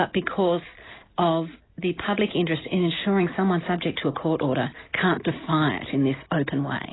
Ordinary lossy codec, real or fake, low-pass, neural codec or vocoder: AAC, 16 kbps; real; 7.2 kHz; none